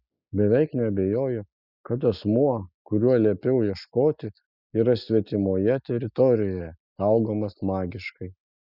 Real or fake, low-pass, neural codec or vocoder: real; 5.4 kHz; none